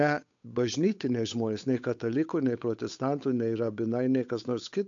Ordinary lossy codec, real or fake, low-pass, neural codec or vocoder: AAC, 64 kbps; fake; 7.2 kHz; codec, 16 kHz, 8 kbps, FunCodec, trained on Chinese and English, 25 frames a second